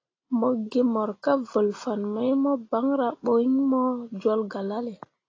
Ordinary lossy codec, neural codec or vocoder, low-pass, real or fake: AAC, 32 kbps; none; 7.2 kHz; real